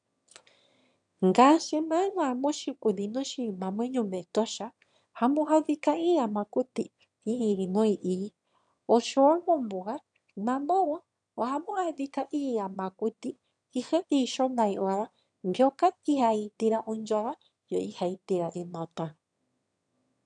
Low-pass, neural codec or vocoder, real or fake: 9.9 kHz; autoencoder, 22.05 kHz, a latent of 192 numbers a frame, VITS, trained on one speaker; fake